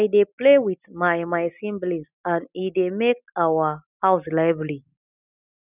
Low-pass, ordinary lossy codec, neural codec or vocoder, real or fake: 3.6 kHz; none; none; real